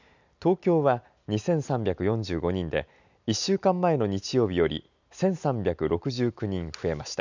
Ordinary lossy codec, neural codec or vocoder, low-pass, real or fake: none; none; 7.2 kHz; real